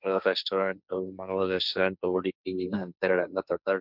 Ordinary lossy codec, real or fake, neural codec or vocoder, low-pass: none; fake; codec, 16 kHz, 1.1 kbps, Voila-Tokenizer; 5.4 kHz